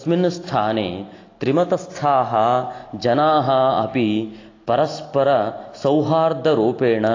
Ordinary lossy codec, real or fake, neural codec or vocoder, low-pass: AAC, 32 kbps; real; none; 7.2 kHz